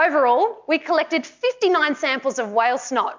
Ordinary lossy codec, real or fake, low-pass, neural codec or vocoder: MP3, 64 kbps; real; 7.2 kHz; none